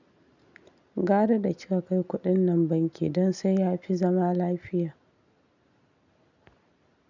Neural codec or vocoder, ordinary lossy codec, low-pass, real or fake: none; none; 7.2 kHz; real